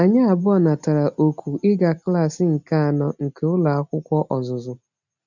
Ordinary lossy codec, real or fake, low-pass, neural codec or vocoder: none; real; 7.2 kHz; none